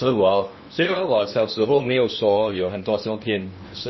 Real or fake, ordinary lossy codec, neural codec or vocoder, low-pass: fake; MP3, 24 kbps; codec, 16 kHz in and 24 kHz out, 0.8 kbps, FocalCodec, streaming, 65536 codes; 7.2 kHz